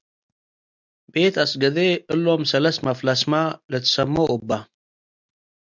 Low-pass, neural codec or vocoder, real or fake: 7.2 kHz; none; real